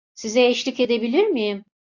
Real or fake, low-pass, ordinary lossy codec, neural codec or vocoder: real; 7.2 kHz; AAC, 48 kbps; none